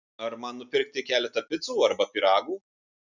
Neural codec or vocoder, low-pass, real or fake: none; 7.2 kHz; real